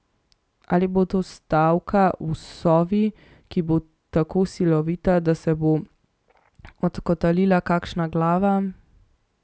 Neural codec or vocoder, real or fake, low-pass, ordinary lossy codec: none; real; none; none